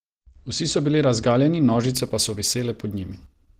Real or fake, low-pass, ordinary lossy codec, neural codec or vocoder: real; 14.4 kHz; Opus, 16 kbps; none